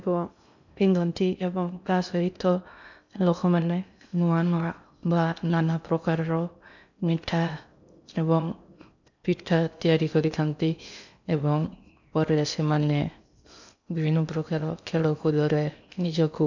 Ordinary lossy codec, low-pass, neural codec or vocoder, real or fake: none; 7.2 kHz; codec, 16 kHz in and 24 kHz out, 0.6 kbps, FocalCodec, streaming, 2048 codes; fake